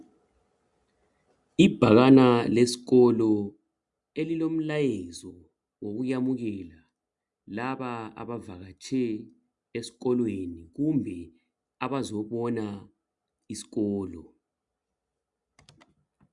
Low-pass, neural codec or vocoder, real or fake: 10.8 kHz; none; real